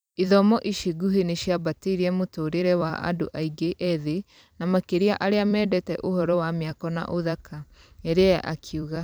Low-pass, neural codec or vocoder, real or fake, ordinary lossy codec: none; vocoder, 44.1 kHz, 128 mel bands every 256 samples, BigVGAN v2; fake; none